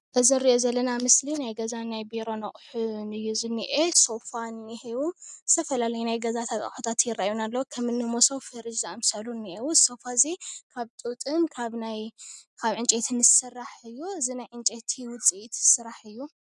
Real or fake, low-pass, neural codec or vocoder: real; 10.8 kHz; none